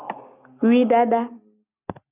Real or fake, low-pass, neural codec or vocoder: real; 3.6 kHz; none